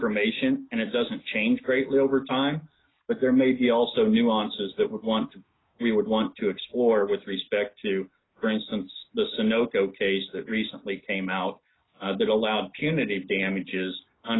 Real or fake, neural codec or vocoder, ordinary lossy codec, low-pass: real; none; AAC, 16 kbps; 7.2 kHz